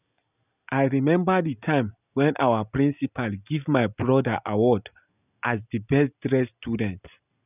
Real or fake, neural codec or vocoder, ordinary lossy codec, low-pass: real; none; none; 3.6 kHz